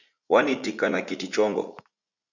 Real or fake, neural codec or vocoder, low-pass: fake; vocoder, 44.1 kHz, 80 mel bands, Vocos; 7.2 kHz